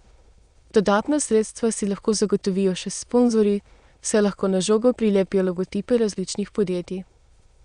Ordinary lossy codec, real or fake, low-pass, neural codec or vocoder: Opus, 64 kbps; fake; 9.9 kHz; autoencoder, 22.05 kHz, a latent of 192 numbers a frame, VITS, trained on many speakers